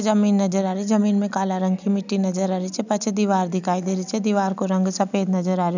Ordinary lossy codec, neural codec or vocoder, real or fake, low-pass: none; none; real; 7.2 kHz